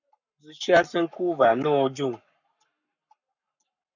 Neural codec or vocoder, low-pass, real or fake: codec, 44.1 kHz, 7.8 kbps, Pupu-Codec; 7.2 kHz; fake